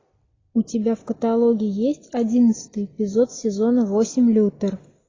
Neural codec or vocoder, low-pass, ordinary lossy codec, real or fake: none; 7.2 kHz; AAC, 32 kbps; real